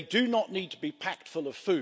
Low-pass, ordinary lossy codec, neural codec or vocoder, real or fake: none; none; none; real